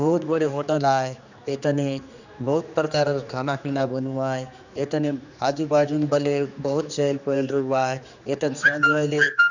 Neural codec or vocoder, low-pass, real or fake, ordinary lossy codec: codec, 16 kHz, 2 kbps, X-Codec, HuBERT features, trained on general audio; 7.2 kHz; fake; none